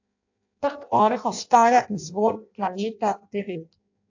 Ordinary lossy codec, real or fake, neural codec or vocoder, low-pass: AAC, 48 kbps; fake; codec, 16 kHz in and 24 kHz out, 0.6 kbps, FireRedTTS-2 codec; 7.2 kHz